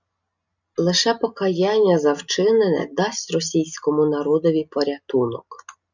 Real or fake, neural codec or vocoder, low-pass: real; none; 7.2 kHz